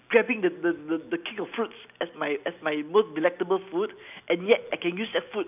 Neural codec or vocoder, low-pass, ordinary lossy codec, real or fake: none; 3.6 kHz; none; real